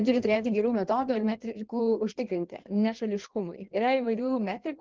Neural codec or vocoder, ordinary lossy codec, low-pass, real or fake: codec, 16 kHz in and 24 kHz out, 1.1 kbps, FireRedTTS-2 codec; Opus, 16 kbps; 7.2 kHz; fake